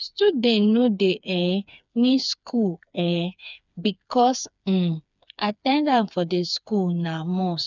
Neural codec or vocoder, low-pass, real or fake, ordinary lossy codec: codec, 16 kHz, 4 kbps, FreqCodec, smaller model; 7.2 kHz; fake; none